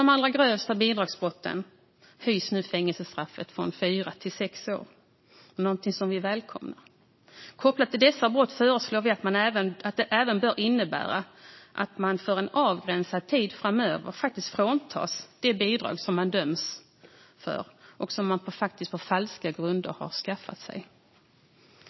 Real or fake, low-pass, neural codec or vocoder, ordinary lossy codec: real; 7.2 kHz; none; MP3, 24 kbps